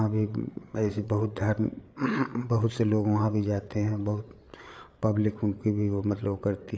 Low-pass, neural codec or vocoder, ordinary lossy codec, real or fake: none; codec, 16 kHz, 8 kbps, FreqCodec, larger model; none; fake